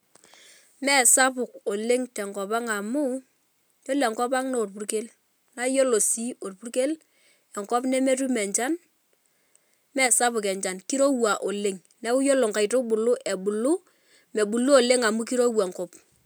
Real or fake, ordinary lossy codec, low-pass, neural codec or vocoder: real; none; none; none